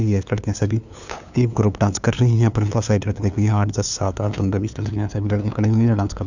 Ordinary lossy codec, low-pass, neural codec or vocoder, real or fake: none; 7.2 kHz; codec, 16 kHz, 2 kbps, FunCodec, trained on LibriTTS, 25 frames a second; fake